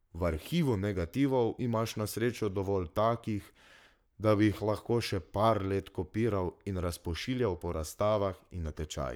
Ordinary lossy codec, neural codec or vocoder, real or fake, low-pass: none; codec, 44.1 kHz, 7.8 kbps, Pupu-Codec; fake; none